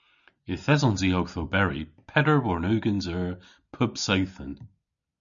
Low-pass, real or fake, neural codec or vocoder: 7.2 kHz; real; none